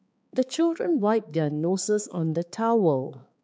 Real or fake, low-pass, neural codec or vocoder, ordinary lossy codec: fake; none; codec, 16 kHz, 4 kbps, X-Codec, HuBERT features, trained on balanced general audio; none